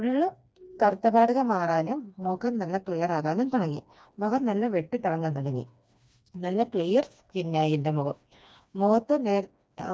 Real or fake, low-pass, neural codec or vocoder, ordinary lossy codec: fake; none; codec, 16 kHz, 2 kbps, FreqCodec, smaller model; none